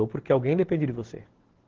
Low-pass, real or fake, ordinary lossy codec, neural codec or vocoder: 7.2 kHz; real; Opus, 16 kbps; none